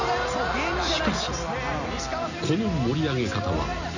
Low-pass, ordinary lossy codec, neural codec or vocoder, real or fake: 7.2 kHz; none; none; real